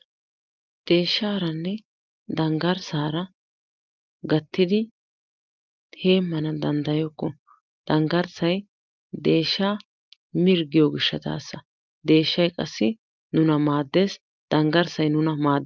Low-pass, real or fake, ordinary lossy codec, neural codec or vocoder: 7.2 kHz; real; Opus, 24 kbps; none